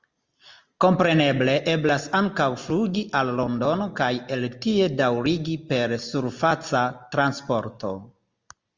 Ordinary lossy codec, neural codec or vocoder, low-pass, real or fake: Opus, 32 kbps; none; 7.2 kHz; real